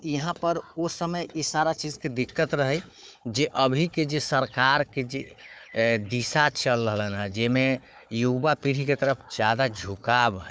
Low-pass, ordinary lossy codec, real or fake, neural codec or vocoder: none; none; fake; codec, 16 kHz, 4 kbps, FunCodec, trained on Chinese and English, 50 frames a second